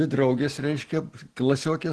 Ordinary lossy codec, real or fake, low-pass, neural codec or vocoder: Opus, 16 kbps; real; 10.8 kHz; none